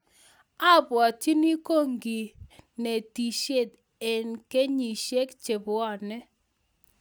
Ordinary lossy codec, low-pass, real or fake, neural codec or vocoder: none; none; real; none